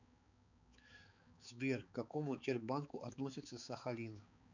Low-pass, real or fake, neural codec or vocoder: 7.2 kHz; fake; codec, 16 kHz, 4 kbps, X-Codec, HuBERT features, trained on balanced general audio